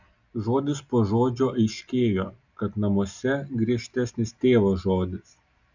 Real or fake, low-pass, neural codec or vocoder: real; 7.2 kHz; none